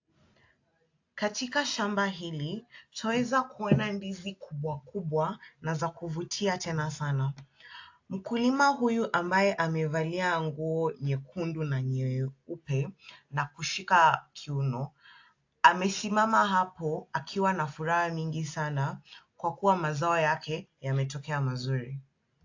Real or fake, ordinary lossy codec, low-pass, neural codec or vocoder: real; AAC, 48 kbps; 7.2 kHz; none